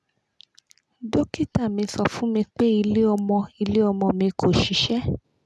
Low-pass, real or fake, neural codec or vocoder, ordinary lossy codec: none; real; none; none